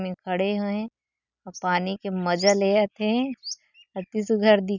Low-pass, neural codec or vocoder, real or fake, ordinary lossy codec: 7.2 kHz; none; real; none